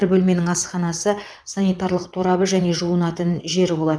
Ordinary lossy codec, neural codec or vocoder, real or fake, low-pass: none; vocoder, 22.05 kHz, 80 mel bands, WaveNeXt; fake; none